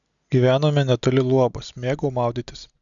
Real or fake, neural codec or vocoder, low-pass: real; none; 7.2 kHz